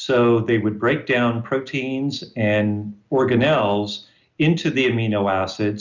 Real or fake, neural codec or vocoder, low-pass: real; none; 7.2 kHz